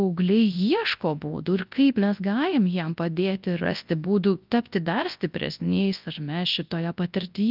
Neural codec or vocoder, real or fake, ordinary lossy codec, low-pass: codec, 24 kHz, 0.9 kbps, WavTokenizer, large speech release; fake; Opus, 24 kbps; 5.4 kHz